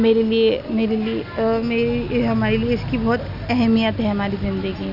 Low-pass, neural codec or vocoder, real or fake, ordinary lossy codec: 5.4 kHz; none; real; none